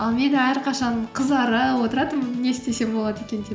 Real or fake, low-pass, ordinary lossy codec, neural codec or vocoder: real; none; none; none